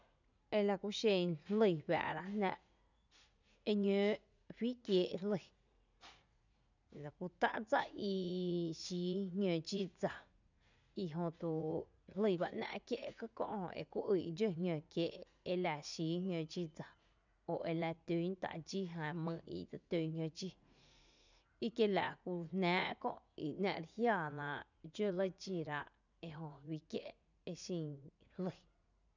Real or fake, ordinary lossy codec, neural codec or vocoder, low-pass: fake; none; vocoder, 44.1 kHz, 80 mel bands, Vocos; 7.2 kHz